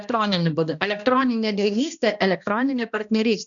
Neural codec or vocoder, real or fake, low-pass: codec, 16 kHz, 1 kbps, X-Codec, HuBERT features, trained on balanced general audio; fake; 7.2 kHz